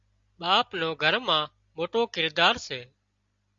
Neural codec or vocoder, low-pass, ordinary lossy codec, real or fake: none; 7.2 kHz; Opus, 64 kbps; real